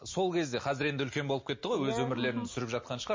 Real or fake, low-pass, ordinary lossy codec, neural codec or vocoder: real; 7.2 kHz; MP3, 32 kbps; none